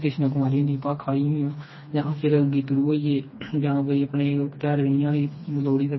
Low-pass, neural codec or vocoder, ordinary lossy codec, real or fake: 7.2 kHz; codec, 16 kHz, 2 kbps, FreqCodec, smaller model; MP3, 24 kbps; fake